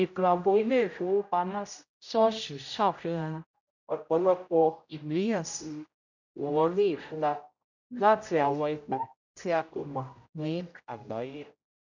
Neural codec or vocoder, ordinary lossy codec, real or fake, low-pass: codec, 16 kHz, 0.5 kbps, X-Codec, HuBERT features, trained on general audio; none; fake; 7.2 kHz